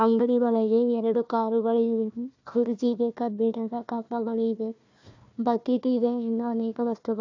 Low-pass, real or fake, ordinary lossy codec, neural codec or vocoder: 7.2 kHz; fake; AAC, 48 kbps; codec, 16 kHz, 1 kbps, FunCodec, trained on Chinese and English, 50 frames a second